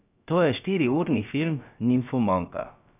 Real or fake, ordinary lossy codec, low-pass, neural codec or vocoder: fake; none; 3.6 kHz; codec, 16 kHz, about 1 kbps, DyCAST, with the encoder's durations